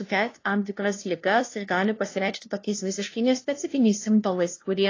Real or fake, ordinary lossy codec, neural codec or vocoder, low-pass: fake; AAC, 32 kbps; codec, 16 kHz, 0.5 kbps, FunCodec, trained on LibriTTS, 25 frames a second; 7.2 kHz